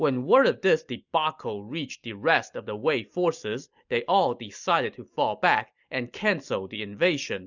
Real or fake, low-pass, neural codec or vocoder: real; 7.2 kHz; none